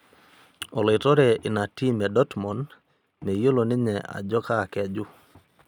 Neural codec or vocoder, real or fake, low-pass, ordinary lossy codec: none; real; 19.8 kHz; none